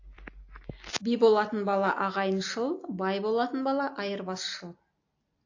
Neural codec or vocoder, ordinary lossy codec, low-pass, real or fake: none; AAC, 48 kbps; 7.2 kHz; real